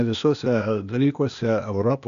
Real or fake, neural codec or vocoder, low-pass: fake; codec, 16 kHz, 0.8 kbps, ZipCodec; 7.2 kHz